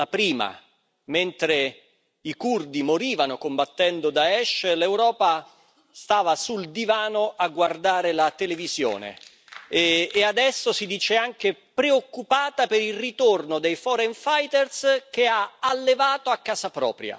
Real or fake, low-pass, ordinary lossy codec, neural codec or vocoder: real; none; none; none